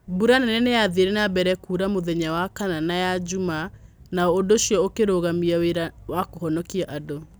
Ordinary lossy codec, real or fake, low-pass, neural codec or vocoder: none; real; none; none